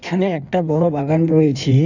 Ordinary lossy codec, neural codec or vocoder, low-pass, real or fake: none; codec, 16 kHz in and 24 kHz out, 1.1 kbps, FireRedTTS-2 codec; 7.2 kHz; fake